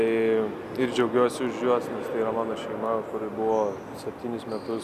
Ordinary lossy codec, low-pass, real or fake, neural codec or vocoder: Opus, 64 kbps; 14.4 kHz; real; none